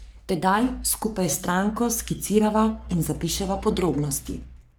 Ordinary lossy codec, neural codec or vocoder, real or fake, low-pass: none; codec, 44.1 kHz, 3.4 kbps, Pupu-Codec; fake; none